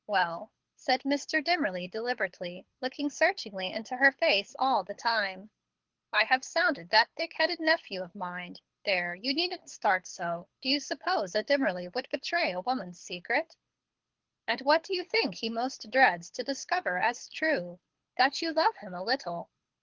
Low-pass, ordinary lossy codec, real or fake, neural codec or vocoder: 7.2 kHz; Opus, 16 kbps; fake; codec, 24 kHz, 6 kbps, HILCodec